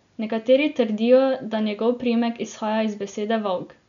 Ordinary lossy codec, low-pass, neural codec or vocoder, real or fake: none; 7.2 kHz; none; real